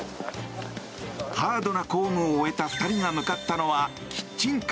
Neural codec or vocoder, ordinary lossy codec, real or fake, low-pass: none; none; real; none